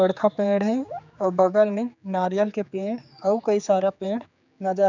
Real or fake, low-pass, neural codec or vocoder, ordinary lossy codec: fake; 7.2 kHz; codec, 16 kHz, 4 kbps, X-Codec, HuBERT features, trained on general audio; none